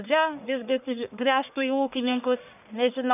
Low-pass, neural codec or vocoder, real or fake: 3.6 kHz; codec, 44.1 kHz, 1.7 kbps, Pupu-Codec; fake